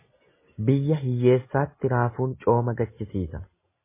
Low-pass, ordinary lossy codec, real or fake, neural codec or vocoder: 3.6 kHz; MP3, 16 kbps; real; none